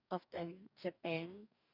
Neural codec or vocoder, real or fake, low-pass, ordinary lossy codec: codec, 44.1 kHz, 2.6 kbps, DAC; fake; 5.4 kHz; none